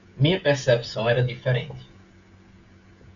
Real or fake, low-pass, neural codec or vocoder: fake; 7.2 kHz; codec, 16 kHz, 16 kbps, FreqCodec, smaller model